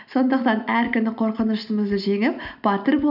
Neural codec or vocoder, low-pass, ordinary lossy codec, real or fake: none; 5.4 kHz; none; real